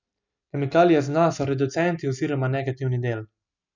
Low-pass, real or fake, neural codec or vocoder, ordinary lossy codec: 7.2 kHz; real; none; none